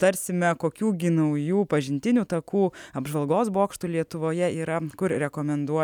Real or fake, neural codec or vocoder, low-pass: real; none; 19.8 kHz